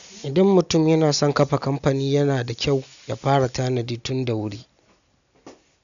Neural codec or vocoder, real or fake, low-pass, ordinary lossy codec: none; real; 7.2 kHz; none